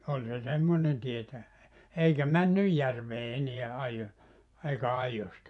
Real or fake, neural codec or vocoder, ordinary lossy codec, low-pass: fake; vocoder, 24 kHz, 100 mel bands, Vocos; MP3, 96 kbps; 10.8 kHz